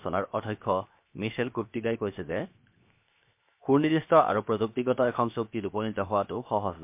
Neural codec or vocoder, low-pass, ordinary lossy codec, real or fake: codec, 16 kHz, 0.7 kbps, FocalCodec; 3.6 kHz; MP3, 32 kbps; fake